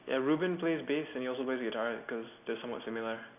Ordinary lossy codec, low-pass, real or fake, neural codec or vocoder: none; 3.6 kHz; real; none